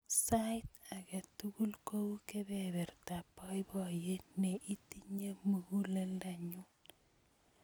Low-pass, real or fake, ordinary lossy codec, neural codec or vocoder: none; real; none; none